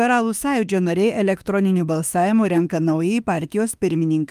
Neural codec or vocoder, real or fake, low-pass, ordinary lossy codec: autoencoder, 48 kHz, 32 numbers a frame, DAC-VAE, trained on Japanese speech; fake; 14.4 kHz; Opus, 32 kbps